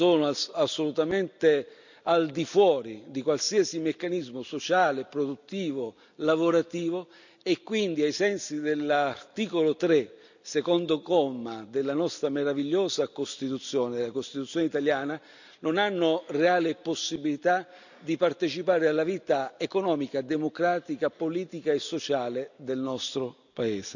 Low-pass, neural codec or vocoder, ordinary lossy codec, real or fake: 7.2 kHz; none; none; real